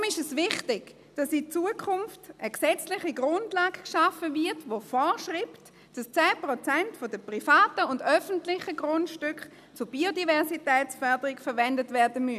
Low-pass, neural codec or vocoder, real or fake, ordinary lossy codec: 14.4 kHz; none; real; none